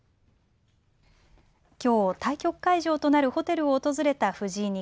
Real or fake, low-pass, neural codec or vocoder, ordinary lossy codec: real; none; none; none